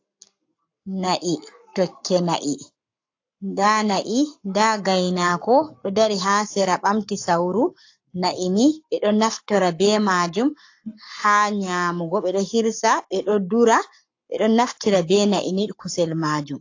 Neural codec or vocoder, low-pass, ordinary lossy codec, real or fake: codec, 44.1 kHz, 7.8 kbps, Pupu-Codec; 7.2 kHz; AAC, 48 kbps; fake